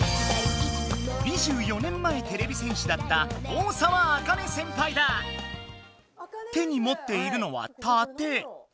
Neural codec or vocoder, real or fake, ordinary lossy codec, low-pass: none; real; none; none